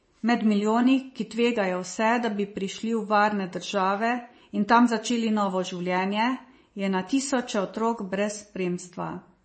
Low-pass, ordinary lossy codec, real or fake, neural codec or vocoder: 10.8 kHz; MP3, 32 kbps; fake; vocoder, 24 kHz, 100 mel bands, Vocos